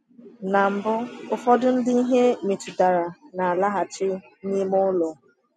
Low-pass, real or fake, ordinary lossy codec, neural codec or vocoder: none; real; none; none